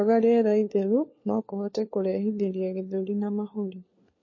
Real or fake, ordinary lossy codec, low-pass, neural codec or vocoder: fake; MP3, 32 kbps; 7.2 kHz; codec, 16 kHz, 4 kbps, FunCodec, trained on LibriTTS, 50 frames a second